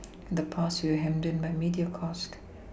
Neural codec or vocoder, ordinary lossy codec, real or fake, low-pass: none; none; real; none